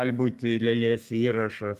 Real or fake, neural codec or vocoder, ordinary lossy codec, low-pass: fake; codec, 32 kHz, 1.9 kbps, SNAC; Opus, 32 kbps; 14.4 kHz